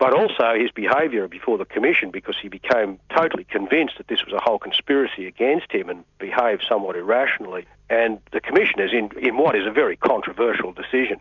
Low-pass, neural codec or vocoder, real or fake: 7.2 kHz; none; real